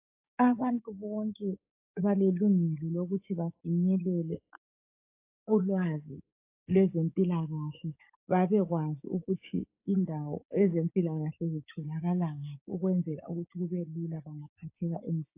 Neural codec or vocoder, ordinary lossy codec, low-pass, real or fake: codec, 16 kHz, 6 kbps, DAC; AAC, 32 kbps; 3.6 kHz; fake